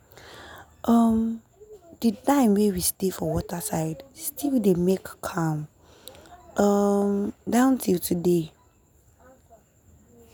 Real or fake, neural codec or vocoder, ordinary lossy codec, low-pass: real; none; none; none